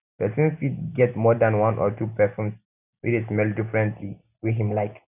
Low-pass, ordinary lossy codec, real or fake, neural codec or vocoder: 3.6 kHz; none; real; none